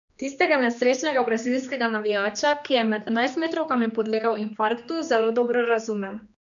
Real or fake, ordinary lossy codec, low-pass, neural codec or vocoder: fake; MP3, 96 kbps; 7.2 kHz; codec, 16 kHz, 2 kbps, X-Codec, HuBERT features, trained on general audio